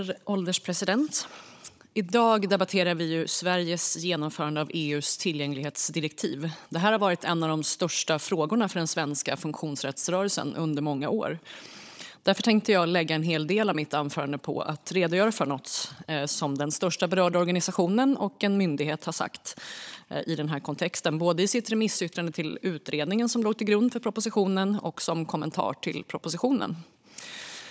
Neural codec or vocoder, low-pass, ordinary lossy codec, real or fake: codec, 16 kHz, 16 kbps, FunCodec, trained on Chinese and English, 50 frames a second; none; none; fake